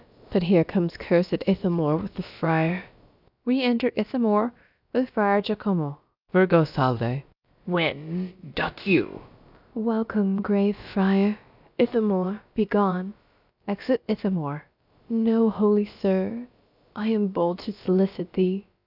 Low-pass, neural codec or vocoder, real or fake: 5.4 kHz; codec, 16 kHz, about 1 kbps, DyCAST, with the encoder's durations; fake